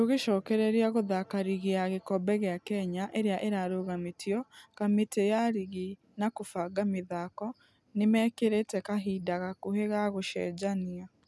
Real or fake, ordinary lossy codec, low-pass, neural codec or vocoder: real; none; none; none